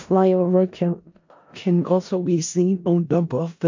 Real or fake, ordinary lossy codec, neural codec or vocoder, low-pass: fake; MP3, 48 kbps; codec, 16 kHz in and 24 kHz out, 0.4 kbps, LongCat-Audio-Codec, four codebook decoder; 7.2 kHz